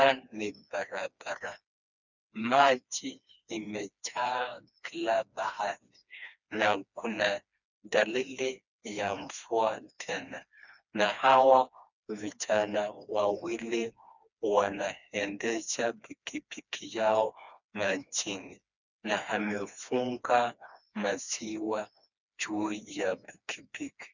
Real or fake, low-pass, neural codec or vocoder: fake; 7.2 kHz; codec, 16 kHz, 2 kbps, FreqCodec, smaller model